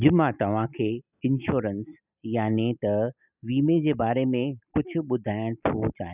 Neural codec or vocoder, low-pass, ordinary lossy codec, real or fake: none; 3.6 kHz; none; real